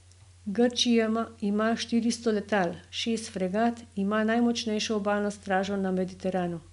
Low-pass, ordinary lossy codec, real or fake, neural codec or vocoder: 10.8 kHz; none; real; none